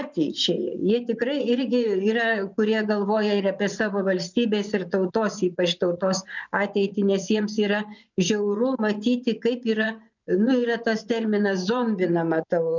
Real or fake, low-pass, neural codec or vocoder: fake; 7.2 kHz; vocoder, 24 kHz, 100 mel bands, Vocos